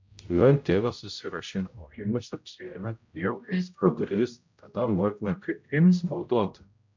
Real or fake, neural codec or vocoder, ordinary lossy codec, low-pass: fake; codec, 16 kHz, 0.5 kbps, X-Codec, HuBERT features, trained on general audio; MP3, 64 kbps; 7.2 kHz